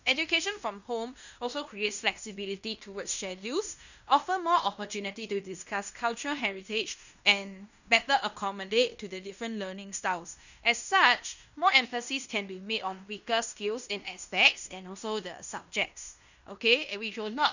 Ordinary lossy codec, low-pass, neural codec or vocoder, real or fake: none; 7.2 kHz; codec, 16 kHz in and 24 kHz out, 0.9 kbps, LongCat-Audio-Codec, fine tuned four codebook decoder; fake